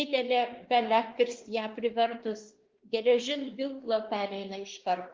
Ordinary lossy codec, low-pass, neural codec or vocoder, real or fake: Opus, 16 kbps; 7.2 kHz; codec, 16 kHz, 2 kbps, X-Codec, WavLM features, trained on Multilingual LibriSpeech; fake